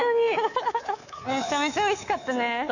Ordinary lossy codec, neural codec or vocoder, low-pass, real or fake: none; codec, 24 kHz, 3.1 kbps, DualCodec; 7.2 kHz; fake